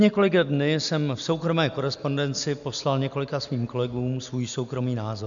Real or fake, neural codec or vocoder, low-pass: real; none; 7.2 kHz